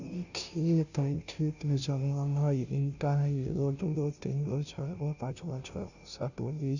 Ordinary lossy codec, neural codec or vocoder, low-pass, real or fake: none; codec, 16 kHz, 0.5 kbps, FunCodec, trained on Chinese and English, 25 frames a second; 7.2 kHz; fake